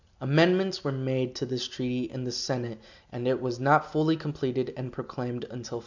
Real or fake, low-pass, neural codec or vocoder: real; 7.2 kHz; none